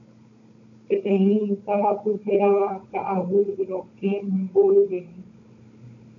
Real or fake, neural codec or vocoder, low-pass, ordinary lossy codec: fake; codec, 16 kHz, 16 kbps, FunCodec, trained on Chinese and English, 50 frames a second; 7.2 kHz; AAC, 48 kbps